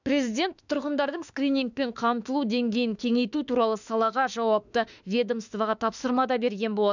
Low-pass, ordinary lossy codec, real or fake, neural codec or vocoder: 7.2 kHz; none; fake; autoencoder, 48 kHz, 32 numbers a frame, DAC-VAE, trained on Japanese speech